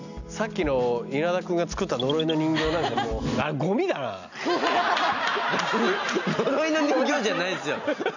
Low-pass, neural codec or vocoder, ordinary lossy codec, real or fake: 7.2 kHz; none; none; real